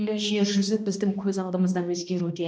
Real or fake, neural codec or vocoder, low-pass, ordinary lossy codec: fake; codec, 16 kHz, 1 kbps, X-Codec, HuBERT features, trained on balanced general audio; none; none